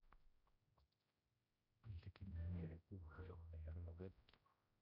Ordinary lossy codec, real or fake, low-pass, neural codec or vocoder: none; fake; 5.4 kHz; codec, 16 kHz, 0.5 kbps, X-Codec, HuBERT features, trained on general audio